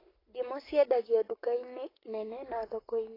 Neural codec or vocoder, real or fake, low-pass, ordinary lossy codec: codec, 44.1 kHz, 7.8 kbps, Pupu-Codec; fake; 5.4 kHz; AAC, 24 kbps